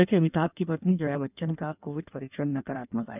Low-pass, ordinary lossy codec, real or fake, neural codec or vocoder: 3.6 kHz; none; fake; codec, 16 kHz in and 24 kHz out, 1.1 kbps, FireRedTTS-2 codec